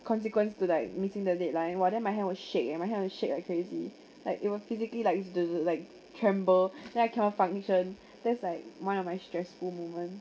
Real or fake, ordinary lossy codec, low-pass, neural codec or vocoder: real; none; none; none